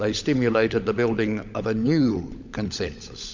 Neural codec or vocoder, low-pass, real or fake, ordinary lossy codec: codec, 16 kHz, 16 kbps, FunCodec, trained on LibriTTS, 50 frames a second; 7.2 kHz; fake; MP3, 64 kbps